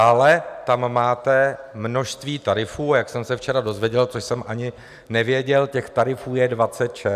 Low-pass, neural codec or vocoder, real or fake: 14.4 kHz; none; real